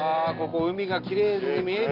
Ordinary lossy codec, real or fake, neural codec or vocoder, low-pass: Opus, 32 kbps; real; none; 5.4 kHz